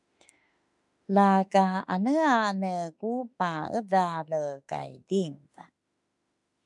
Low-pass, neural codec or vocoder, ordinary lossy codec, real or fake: 10.8 kHz; autoencoder, 48 kHz, 32 numbers a frame, DAC-VAE, trained on Japanese speech; MP3, 96 kbps; fake